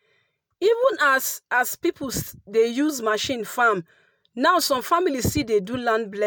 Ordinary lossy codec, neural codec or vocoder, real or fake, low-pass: none; none; real; none